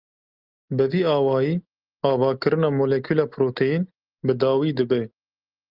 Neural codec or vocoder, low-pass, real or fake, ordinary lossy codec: none; 5.4 kHz; real; Opus, 32 kbps